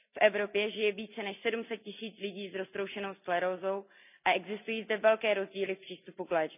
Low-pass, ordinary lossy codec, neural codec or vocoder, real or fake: 3.6 kHz; none; none; real